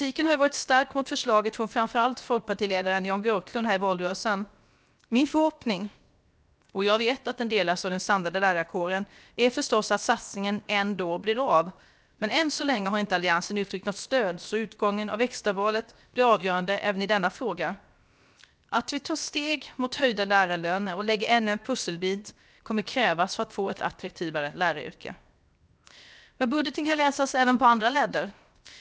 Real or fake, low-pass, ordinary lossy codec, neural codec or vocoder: fake; none; none; codec, 16 kHz, 0.7 kbps, FocalCodec